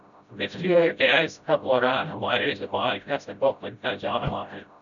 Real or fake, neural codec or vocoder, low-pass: fake; codec, 16 kHz, 0.5 kbps, FreqCodec, smaller model; 7.2 kHz